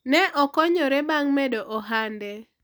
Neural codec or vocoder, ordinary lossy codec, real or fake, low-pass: none; none; real; none